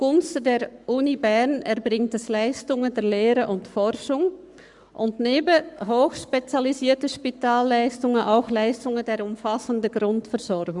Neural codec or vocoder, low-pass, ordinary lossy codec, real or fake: codec, 44.1 kHz, 7.8 kbps, Pupu-Codec; 10.8 kHz; Opus, 64 kbps; fake